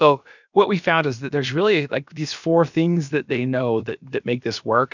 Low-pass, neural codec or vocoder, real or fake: 7.2 kHz; codec, 16 kHz, about 1 kbps, DyCAST, with the encoder's durations; fake